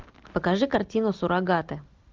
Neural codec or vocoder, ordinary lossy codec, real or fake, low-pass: none; Opus, 32 kbps; real; 7.2 kHz